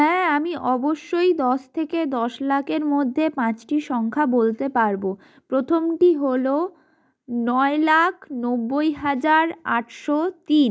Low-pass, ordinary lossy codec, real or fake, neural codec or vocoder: none; none; real; none